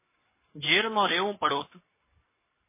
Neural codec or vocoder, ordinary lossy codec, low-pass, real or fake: codec, 44.1 kHz, 7.8 kbps, Pupu-Codec; MP3, 16 kbps; 3.6 kHz; fake